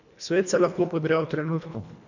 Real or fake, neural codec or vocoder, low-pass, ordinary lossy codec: fake; codec, 24 kHz, 1.5 kbps, HILCodec; 7.2 kHz; none